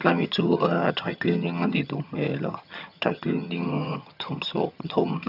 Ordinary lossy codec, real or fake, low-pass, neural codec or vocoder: none; fake; 5.4 kHz; vocoder, 22.05 kHz, 80 mel bands, HiFi-GAN